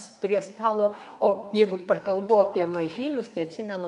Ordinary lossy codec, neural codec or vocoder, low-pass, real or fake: MP3, 96 kbps; codec, 24 kHz, 1 kbps, SNAC; 10.8 kHz; fake